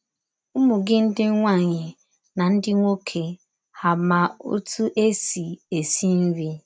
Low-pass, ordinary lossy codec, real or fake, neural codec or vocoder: none; none; real; none